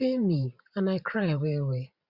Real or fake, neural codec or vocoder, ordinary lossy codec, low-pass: fake; vocoder, 44.1 kHz, 128 mel bands every 512 samples, BigVGAN v2; Opus, 64 kbps; 5.4 kHz